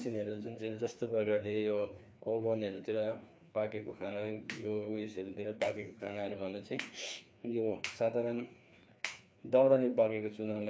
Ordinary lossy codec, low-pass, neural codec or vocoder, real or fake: none; none; codec, 16 kHz, 2 kbps, FreqCodec, larger model; fake